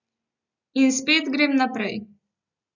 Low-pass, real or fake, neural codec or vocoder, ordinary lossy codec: 7.2 kHz; real; none; none